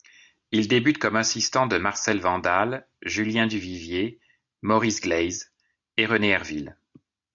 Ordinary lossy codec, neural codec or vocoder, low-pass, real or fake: AAC, 64 kbps; none; 7.2 kHz; real